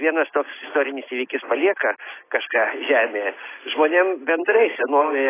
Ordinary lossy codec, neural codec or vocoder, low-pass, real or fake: AAC, 16 kbps; none; 3.6 kHz; real